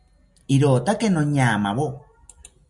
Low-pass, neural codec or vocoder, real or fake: 10.8 kHz; none; real